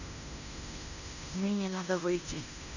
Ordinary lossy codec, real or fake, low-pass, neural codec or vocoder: none; fake; 7.2 kHz; codec, 16 kHz in and 24 kHz out, 0.9 kbps, LongCat-Audio-Codec, fine tuned four codebook decoder